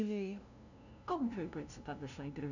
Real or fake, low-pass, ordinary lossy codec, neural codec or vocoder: fake; 7.2 kHz; none; codec, 16 kHz, 0.5 kbps, FunCodec, trained on LibriTTS, 25 frames a second